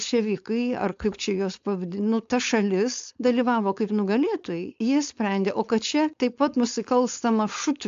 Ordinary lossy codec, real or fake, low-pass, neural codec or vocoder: AAC, 64 kbps; fake; 7.2 kHz; codec, 16 kHz, 4.8 kbps, FACodec